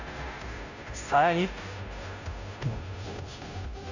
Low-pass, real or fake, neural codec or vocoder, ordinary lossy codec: 7.2 kHz; fake; codec, 16 kHz, 0.5 kbps, FunCodec, trained on Chinese and English, 25 frames a second; none